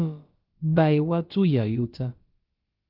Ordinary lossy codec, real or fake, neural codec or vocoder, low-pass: Opus, 24 kbps; fake; codec, 16 kHz, about 1 kbps, DyCAST, with the encoder's durations; 5.4 kHz